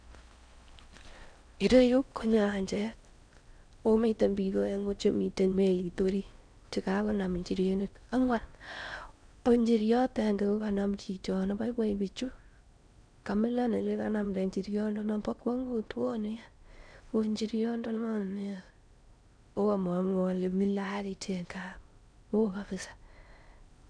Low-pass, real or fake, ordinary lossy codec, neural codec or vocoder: 9.9 kHz; fake; none; codec, 16 kHz in and 24 kHz out, 0.6 kbps, FocalCodec, streaming, 4096 codes